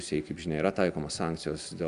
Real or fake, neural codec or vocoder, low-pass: real; none; 10.8 kHz